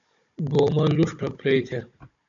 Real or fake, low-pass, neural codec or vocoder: fake; 7.2 kHz; codec, 16 kHz, 16 kbps, FunCodec, trained on Chinese and English, 50 frames a second